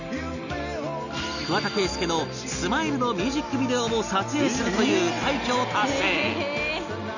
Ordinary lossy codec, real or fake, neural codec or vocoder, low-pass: none; real; none; 7.2 kHz